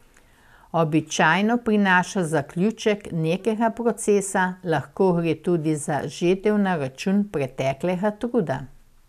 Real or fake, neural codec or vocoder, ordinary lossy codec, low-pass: real; none; none; 14.4 kHz